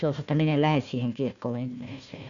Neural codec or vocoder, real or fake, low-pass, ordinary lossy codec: codec, 16 kHz, 1 kbps, FunCodec, trained on Chinese and English, 50 frames a second; fake; 7.2 kHz; none